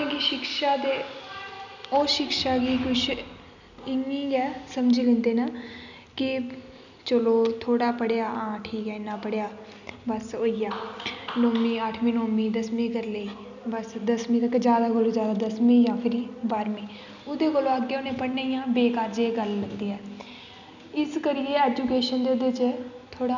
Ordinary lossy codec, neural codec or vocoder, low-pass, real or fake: none; none; 7.2 kHz; real